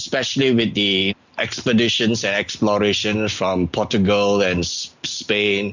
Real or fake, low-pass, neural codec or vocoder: real; 7.2 kHz; none